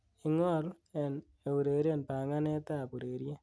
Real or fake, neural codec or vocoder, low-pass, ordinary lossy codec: real; none; none; none